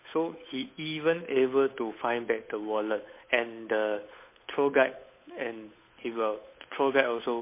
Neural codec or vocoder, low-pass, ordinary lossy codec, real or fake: codec, 16 kHz, 8 kbps, FunCodec, trained on Chinese and English, 25 frames a second; 3.6 kHz; MP3, 24 kbps; fake